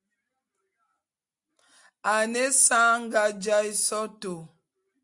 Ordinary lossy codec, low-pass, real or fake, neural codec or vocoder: Opus, 64 kbps; 10.8 kHz; real; none